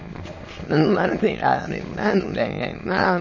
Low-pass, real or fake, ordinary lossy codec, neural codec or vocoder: 7.2 kHz; fake; MP3, 32 kbps; autoencoder, 22.05 kHz, a latent of 192 numbers a frame, VITS, trained on many speakers